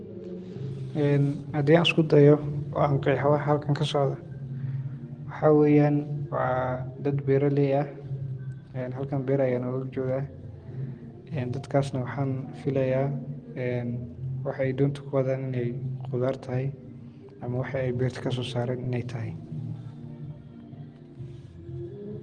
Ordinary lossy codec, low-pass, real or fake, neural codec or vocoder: Opus, 24 kbps; 9.9 kHz; real; none